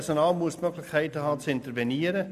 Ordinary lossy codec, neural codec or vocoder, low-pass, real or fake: MP3, 96 kbps; none; 14.4 kHz; real